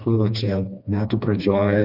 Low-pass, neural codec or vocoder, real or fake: 5.4 kHz; codec, 16 kHz, 2 kbps, FreqCodec, smaller model; fake